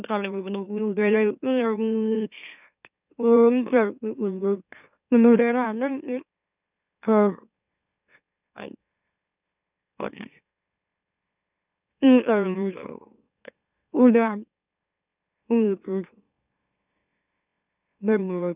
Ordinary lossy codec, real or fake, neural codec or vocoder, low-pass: none; fake; autoencoder, 44.1 kHz, a latent of 192 numbers a frame, MeloTTS; 3.6 kHz